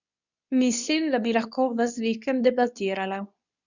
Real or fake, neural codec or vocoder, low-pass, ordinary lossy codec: fake; codec, 24 kHz, 0.9 kbps, WavTokenizer, medium speech release version 2; 7.2 kHz; none